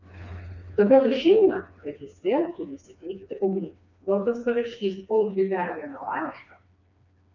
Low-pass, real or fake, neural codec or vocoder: 7.2 kHz; fake; codec, 16 kHz, 2 kbps, FreqCodec, smaller model